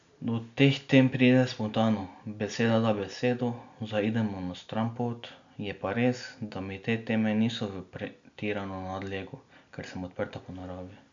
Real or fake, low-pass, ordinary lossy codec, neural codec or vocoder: real; 7.2 kHz; MP3, 64 kbps; none